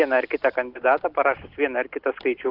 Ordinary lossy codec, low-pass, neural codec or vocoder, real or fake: Opus, 32 kbps; 5.4 kHz; none; real